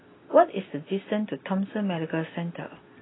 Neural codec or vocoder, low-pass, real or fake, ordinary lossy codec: none; 7.2 kHz; real; AAC, 16 kbps